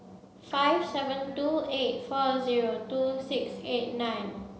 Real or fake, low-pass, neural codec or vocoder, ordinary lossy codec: real; none; none; none